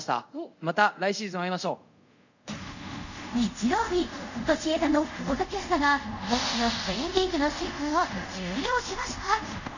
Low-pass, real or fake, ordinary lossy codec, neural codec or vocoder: 7.2 kHz; fake; none; codec, 24 kHz, 0.5 kbps, DualCodec